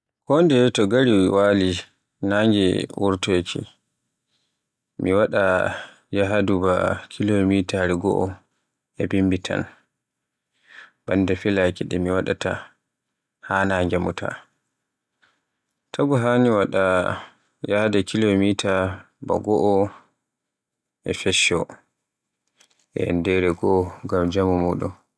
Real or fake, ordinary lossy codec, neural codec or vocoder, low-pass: real; none; none; none